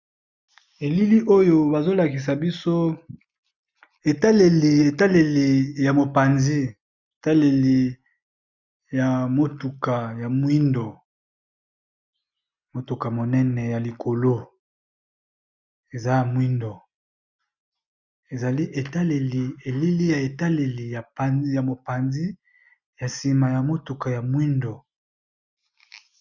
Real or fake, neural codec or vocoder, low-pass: real; none; 7.2 kHz